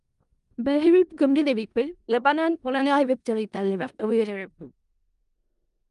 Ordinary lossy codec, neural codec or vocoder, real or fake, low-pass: Opus, 32 kbps; codec, 16 kHz in and 24 kHz out, 0.4 kbps, LongCat-Audio-Codec, four codebook decoder; fake; 10.8 kHz